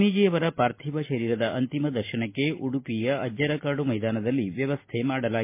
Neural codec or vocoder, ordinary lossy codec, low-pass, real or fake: none; MP3, 24 kbps; 3.6 kHz; real